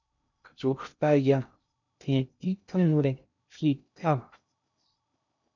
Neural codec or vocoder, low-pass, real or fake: codec, 16 kHz in and 24 kHz out, 0.6 kbps, FocalCodec, streaming, 2048 codes; 7.2 kHz; fake